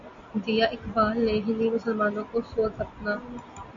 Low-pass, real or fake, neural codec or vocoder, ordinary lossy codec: 7.2 kHz; real; none; MP3, 48 kbps